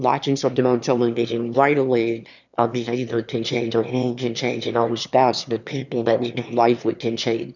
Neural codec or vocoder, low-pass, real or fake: autoencoder, 22.05 kHz, a latent of 192 numbers a frame, VITS, trained on one speaker; 7.2 kHz; fake